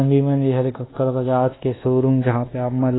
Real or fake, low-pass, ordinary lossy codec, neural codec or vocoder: fake; 7.2 kHz; AAC, 16 kbps; codec, 24 kHz, 1.2 kbps, DualCodec